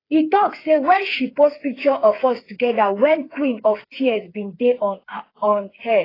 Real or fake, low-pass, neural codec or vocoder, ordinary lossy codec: fake; 5.4 kHz; codec, 16 kHz, 4 kbps, FreqCodec, smaller model; AAC, 24 kbps